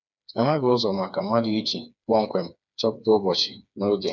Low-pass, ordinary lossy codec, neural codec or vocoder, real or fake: 7.2 kHz; none; codec, 16 kHz, 4 kbps, FreqCodec, smaller model; fake